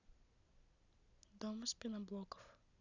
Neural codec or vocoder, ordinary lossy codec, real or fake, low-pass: none; none; real; 7.2 kHz